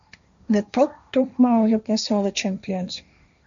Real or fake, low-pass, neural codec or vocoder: fake; 7.2 kHz; codec, 16 kHz, 1.1 kbps, Voila-Tokenizer